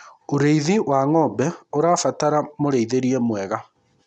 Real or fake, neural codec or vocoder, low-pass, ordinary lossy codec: real; none; 10.8 kHz; none